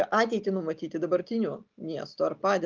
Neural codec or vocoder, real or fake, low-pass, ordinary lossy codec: none; real; 7.2 kHz; Opus, 24 kbps